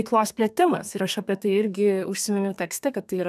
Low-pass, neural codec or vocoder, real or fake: 14.4 kHz; codec, 32 kHz, 1.9 kbps, SNAC; fake